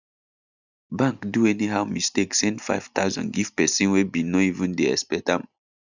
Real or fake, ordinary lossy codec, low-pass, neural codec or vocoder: real; none; 7.2 kHz; none